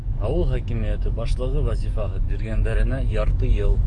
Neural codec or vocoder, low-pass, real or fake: codec, 44.1 kHz, 7.8 kbps, DAC; 10.8 kHz; fake